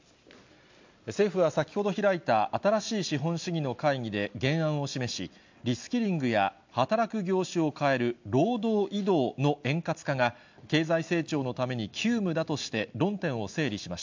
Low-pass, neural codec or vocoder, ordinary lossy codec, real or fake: 7.2 kHz; none; MP3, 64 kbps; real